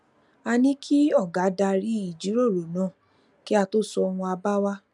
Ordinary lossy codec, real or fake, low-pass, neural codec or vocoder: none; real; 10.8 kHz; none